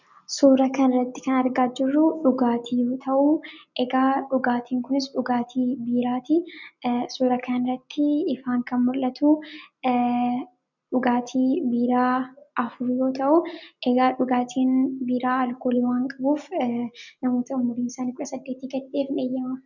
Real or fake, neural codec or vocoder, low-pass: real; none; 7.2 kHz